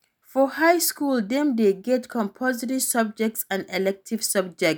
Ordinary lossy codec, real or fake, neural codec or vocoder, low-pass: none; real; none; none